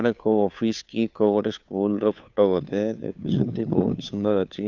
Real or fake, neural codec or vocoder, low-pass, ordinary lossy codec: fake; codec, 16 kHz, 2 kbps, FunCodec, trained on Chinese and English, 25 frames a second; 7.2 kHz; none